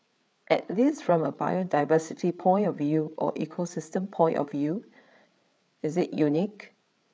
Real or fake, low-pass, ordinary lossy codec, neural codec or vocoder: fake; none; none; codec, 16 kHz, 8 kbps, FreqCodec, larger model